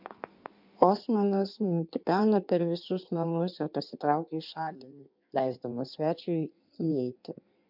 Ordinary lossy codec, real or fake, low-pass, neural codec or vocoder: MP3, 48 kbps; fake; 5.4 kHz; codec, 16 kHz in and 24 kHz out, 1.1 kbps, FireRedTTS-2 codec